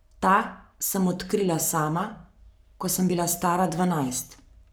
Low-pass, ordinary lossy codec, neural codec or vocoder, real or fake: none; none; codec, 44.1 kHz, 7.8 kbps, Pupu-Codec; fake